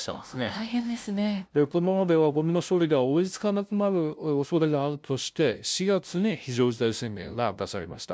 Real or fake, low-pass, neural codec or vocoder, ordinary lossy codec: fake; none; codec, 16 kHz, 0.5 kbps, FunCodec, trained on LibriTTS, 25 frames a second; none